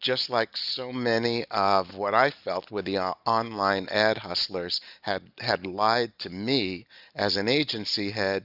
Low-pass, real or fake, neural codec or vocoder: 5.4 kHz; real; none